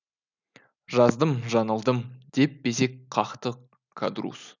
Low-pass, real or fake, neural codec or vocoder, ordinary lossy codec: 7.2 kHz; real; none; none